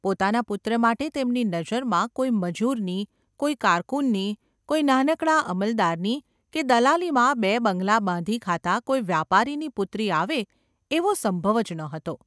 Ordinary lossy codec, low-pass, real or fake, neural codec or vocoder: none; none; real; none